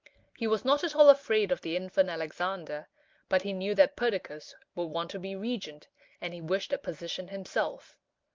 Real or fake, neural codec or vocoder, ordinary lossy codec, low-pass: real; none; Opus, 24 kbps; 7.2 kHz